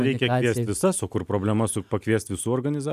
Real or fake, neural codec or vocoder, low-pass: real; none; 14.4 kHz